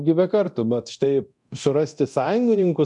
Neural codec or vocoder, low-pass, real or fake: codec, 24 kHz, 0.9 kbps, DualCodec; 10.8 kHz; fake